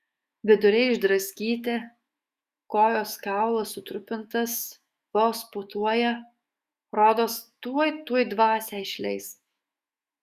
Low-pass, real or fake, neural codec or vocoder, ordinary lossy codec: 14.4 kHz; fake; autoencoder, 48 kHz, 128 numbers a frame, DAC-VAE, trained on Japanese speech; Opus, 64 kbps